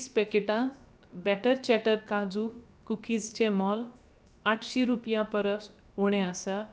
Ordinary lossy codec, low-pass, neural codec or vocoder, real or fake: none; none; codec, 16 kHz, 0.7 kbps, FocalCodec; fake